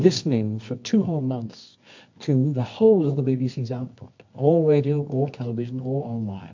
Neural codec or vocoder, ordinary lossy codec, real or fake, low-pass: codec, 24 kHz, 0.9 kbps, WavTokenizer, medium music audio release; MP3, 48 kbps; fake; 7.2 kHz